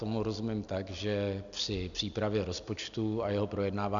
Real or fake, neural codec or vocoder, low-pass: real; none; 7.2 kHz